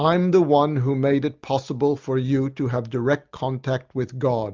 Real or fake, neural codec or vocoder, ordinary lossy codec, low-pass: real; none; Opus, 24 kbps; 7.2 kHz